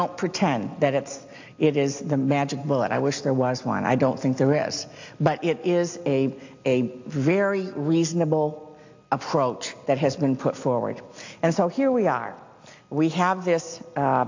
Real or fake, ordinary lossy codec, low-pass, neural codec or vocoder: real; AAC, 48 kbps; 7.2 kHz; none